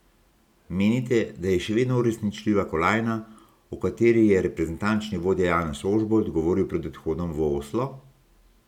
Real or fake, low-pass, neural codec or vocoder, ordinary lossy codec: real; 19.8 kHz; none; none